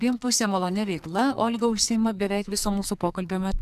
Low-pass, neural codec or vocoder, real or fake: 14.4 kHz; codec, 44.1 kHz, 2.6 kbps, SNAC; fake